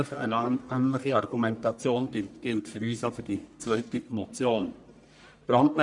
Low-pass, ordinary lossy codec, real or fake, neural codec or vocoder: 10.8 kHz; none; fake; codec, 44.1 kHz, 1.7 kbps, Pupu-Codec